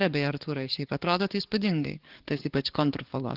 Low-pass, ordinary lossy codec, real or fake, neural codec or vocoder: 5.4 kHz; Opus, 16 kbps; fake; codec, 44.1 kHz, 7.8 kbps, DAC